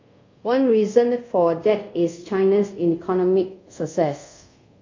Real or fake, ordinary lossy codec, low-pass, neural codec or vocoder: fake; MP3, 48 kbps; 7.2 kHz; codec, 24 kHz, 0.5 kbps, DualCodec